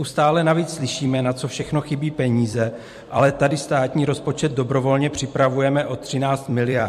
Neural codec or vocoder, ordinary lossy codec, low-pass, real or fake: vocoder, 44.1 kHz, 128 mel bands every 256 samples, BigVGAN v2; MP3, 64 kbps; 14.4 kHz; fake